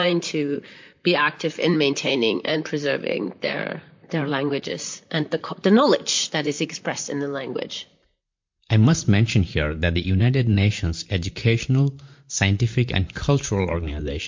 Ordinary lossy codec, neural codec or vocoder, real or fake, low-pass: MP3, 48 kbps; vocoder, 44.1 kHz, 128 mel bands, Pupu-Vocoder; fake; 7.2 kHz